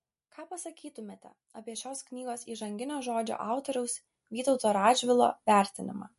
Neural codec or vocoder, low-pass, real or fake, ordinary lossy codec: none; 14.4 kHz; real; MP3, 48 kbps